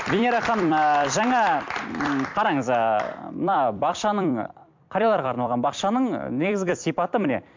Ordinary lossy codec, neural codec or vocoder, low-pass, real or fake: MP3, 64 kbps; vocoder, 44.1 kHz, 128 mel bands every 256 samples, BigVGAN v2; 7.2 kHz; fake